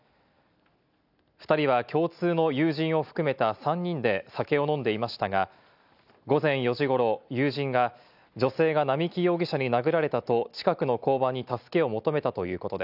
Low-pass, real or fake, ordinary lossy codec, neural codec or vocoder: 5.4 kHz; real; none; none